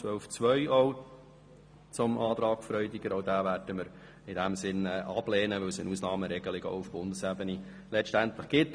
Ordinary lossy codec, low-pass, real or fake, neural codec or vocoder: MP3, 96 kbps; 9.9 kHz; real; none